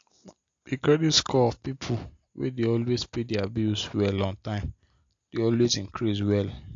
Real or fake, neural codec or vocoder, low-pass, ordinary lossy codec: real; none; 7.2 kHz; AAC, 48 kbps